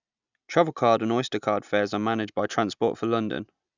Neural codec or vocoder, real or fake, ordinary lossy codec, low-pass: none; real; none; 7.2 kHz